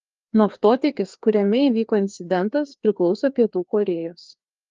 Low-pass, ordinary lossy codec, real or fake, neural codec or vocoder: 7.2 kHz; Opus, 32 kbps; fake; codec, 16 kHz, 2 kbps, FreqCodec, larger model